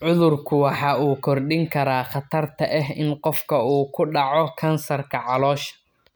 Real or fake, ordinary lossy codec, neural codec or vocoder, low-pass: real; none; none; none